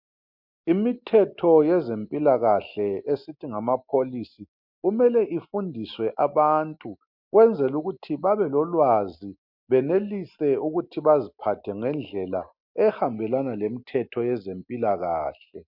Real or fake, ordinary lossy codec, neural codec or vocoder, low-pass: real; MP3, 48 kbps; none; 5.4 kHz